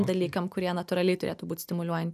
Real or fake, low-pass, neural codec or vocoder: real; 14.4 kHz; none